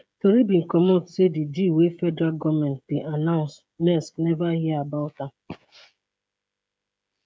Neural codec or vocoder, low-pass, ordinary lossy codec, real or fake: codec, 16 kHz, 16 kbps, FreqCodec, smaller model; none; none; fake